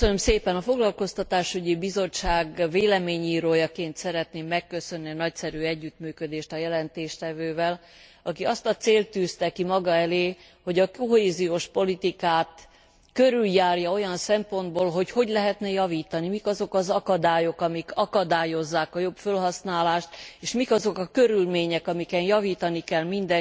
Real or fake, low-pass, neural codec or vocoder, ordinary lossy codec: real; none; none; none